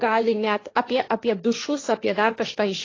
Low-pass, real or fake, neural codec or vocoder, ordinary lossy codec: 7.2 kHz; fake; codec, 16 kHz, 1.1 kbps, Voila-Tokenizer; AAC, 32 kbps